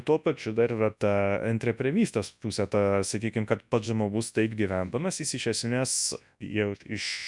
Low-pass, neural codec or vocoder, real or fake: 10.8 kHz; codec, 24 kHz, 0.9 kbps, WavTokenizer, large speech release; fake